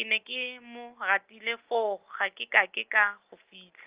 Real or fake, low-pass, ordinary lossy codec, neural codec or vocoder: real; 3.6 kHz; Opus, 32 kbps; none